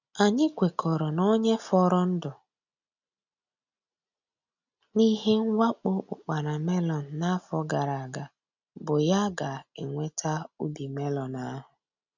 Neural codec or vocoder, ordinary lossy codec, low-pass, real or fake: none; none; 7.2 kHz; real